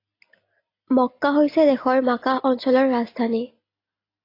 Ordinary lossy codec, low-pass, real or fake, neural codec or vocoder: AAC, 32 kbps; 5.4 kHz; real; none